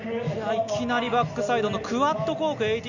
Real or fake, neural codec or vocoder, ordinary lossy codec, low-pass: real; none; none; 7.2 kHz